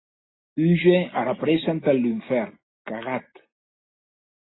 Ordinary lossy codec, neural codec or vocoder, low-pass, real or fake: AAC, 16 kbps; none; 7.2 kHz; real